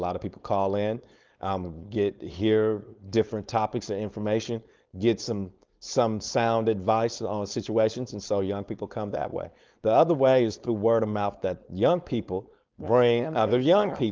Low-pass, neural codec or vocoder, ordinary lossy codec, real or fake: 7.2 kHz; codec, 16 kHz, 4.8 kbps, FACodec; Opus, 32 kbps; fake